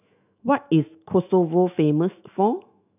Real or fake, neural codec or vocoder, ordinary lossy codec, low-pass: fake; autoencoder, 48 kHz, 128 numbers a frame, DAC-VAE, trained on Japanese speech; none; 3.6 kHz